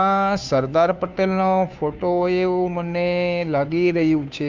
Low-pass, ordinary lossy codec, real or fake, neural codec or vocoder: 7.2 kHz; MP3, 64 kbps; fake; codec, 16 kHz, 2 kbps, FunCodec, trained on Chinese and English, 25 frames a second